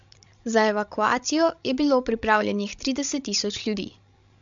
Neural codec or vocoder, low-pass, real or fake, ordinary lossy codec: codec, 16 kHz, 16 kbps, FreqCodec, larger model; 7.2 kHz; fake; MP3, 64 kbps